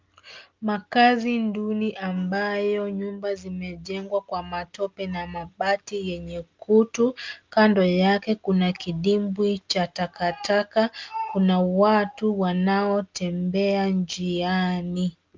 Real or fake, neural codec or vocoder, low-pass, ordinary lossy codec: real; none; 7.2 kHz; Opus, 24 kbps